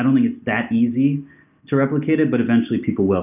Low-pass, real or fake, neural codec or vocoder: 3.6 kHz; real; none